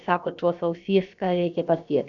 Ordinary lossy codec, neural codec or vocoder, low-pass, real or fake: MP3, 96 kbps; codec, 16 kHz, about 1 kbps, DyCAST, with the encoder's durations; 7.2 kHz; fake